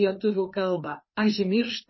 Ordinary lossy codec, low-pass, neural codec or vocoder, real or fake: MP3, 24 kbps; 7.2 kHz; codec, 16 kHz, 2 kbps, FreqCodec, larger model; fake